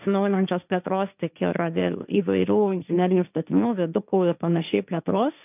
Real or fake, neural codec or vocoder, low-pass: fake; codec, 16 kHz, 1.1 kbps, Voila-Tokenizer; 3.6 kHz